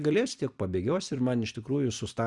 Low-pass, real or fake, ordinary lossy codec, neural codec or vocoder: 10.8 kHz; fake; Opus, 64 kbps; vocoder, 44.1 kHz, 128 mel bands every 256 samples, BigVGAN v2